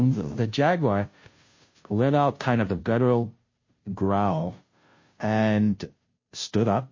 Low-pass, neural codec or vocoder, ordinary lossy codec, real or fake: 7.2 kHz; codec, 16 kHz, 0.5 kbps, FunCodec, trained on Chinese and English, 25 frames a second; MP3, 32 kbps; fake